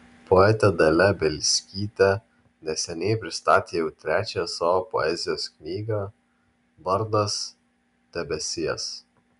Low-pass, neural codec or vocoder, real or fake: 10.8 kHz; none; real